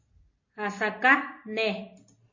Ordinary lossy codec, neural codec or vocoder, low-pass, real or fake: MP3, 32 kbps; none; 7.2 kHz; real